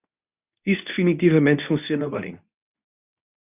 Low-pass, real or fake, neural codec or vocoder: 3.6 kHz; fake; codec, 24 kHz, 0.9 kbps, WavTokenizer, medium speech release version 2